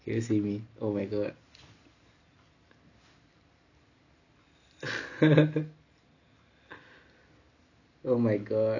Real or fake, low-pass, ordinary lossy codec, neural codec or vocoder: real; 7.2 kHz; none; none